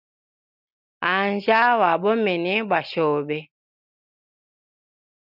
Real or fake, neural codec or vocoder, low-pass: real; none; 5.4 kHz